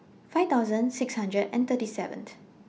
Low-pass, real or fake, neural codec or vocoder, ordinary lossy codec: none; real; none; none